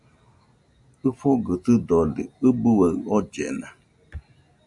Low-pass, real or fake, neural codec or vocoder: 10.8 kHz; real; none